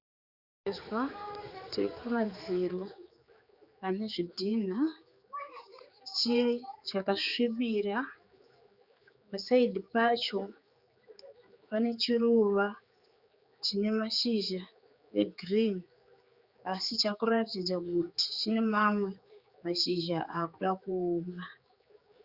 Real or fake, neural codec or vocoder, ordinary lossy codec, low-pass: fake; codec, 16 kHz, 4 kbps, X-Codec, HuBERT features, trained on general audio; Opus, 64 kbps; 5.4 kHz